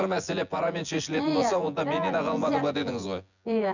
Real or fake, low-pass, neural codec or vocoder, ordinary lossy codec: fake; 7.2 kHz; vocoder, 24 kHz, 100 mel bands, Vocos; none